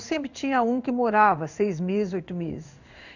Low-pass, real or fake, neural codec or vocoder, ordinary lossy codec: 7.2 kHz; fake; codec, 16 kHz in and 24 kHz out, 1 kbps, XY-Tokenizer; none